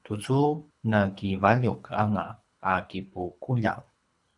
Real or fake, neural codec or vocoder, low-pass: fake; codec, 24 kHz, 3 kbps, HILCodec; 10.8 kHz